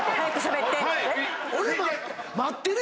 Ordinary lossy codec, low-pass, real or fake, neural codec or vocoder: none; none; real; none